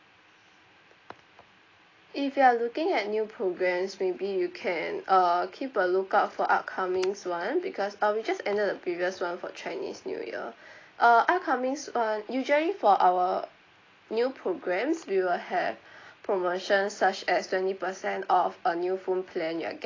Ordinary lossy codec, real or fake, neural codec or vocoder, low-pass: AAC, 32 kbps; real; none; 7.2 kHz